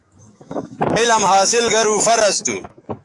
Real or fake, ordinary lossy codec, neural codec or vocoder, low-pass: fake; AAC, 64 kbps; autoencoder, 48 kHz, 128 numbers a frame, DAC-VAE, trained on Japanese speech; 10.8 kHz